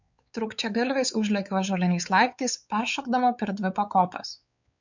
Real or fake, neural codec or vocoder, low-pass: fake; codec, 16 kHz, 4 kbps, X-Codec, WavLM features, trained on Multilingual LibriSpeech; 7.2 kHz